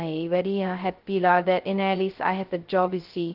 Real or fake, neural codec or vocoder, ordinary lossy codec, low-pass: fake; codec, 16 kHz, 0.2 kbps, FocalCodec; Opus, 16 kbps; 5.4 kHz